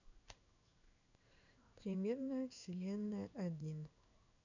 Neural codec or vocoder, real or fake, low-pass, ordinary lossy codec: codec, 16 kHz in and 24 kHz out, 1 kbps, XY-Tokenizer; fake; 7.2 kHz; none